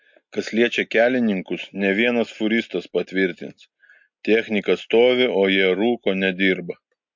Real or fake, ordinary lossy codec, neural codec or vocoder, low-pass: real; MP3, 48 kbps; none; 7.2 kHz